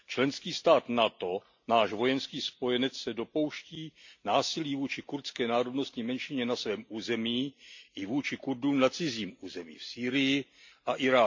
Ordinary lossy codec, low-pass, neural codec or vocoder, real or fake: MP3, 48 kbps; 7.2 kHz; none; real